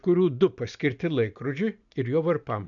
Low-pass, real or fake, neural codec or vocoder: 7.2 kHz; real; none